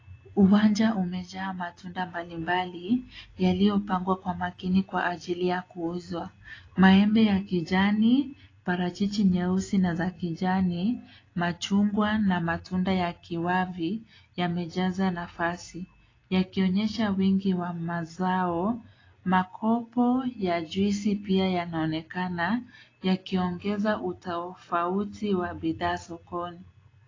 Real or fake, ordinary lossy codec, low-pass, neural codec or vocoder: real; AAC, 32 kbps; 7.2 kHz; none